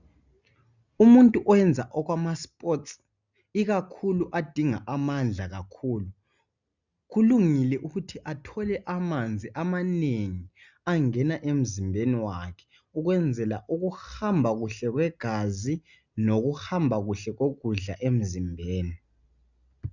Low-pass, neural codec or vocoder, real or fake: 7.2 kHz; none; real